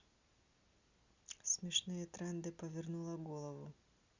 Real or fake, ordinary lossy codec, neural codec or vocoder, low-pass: real; Opus, 64 kbps; none; 7.2 kHz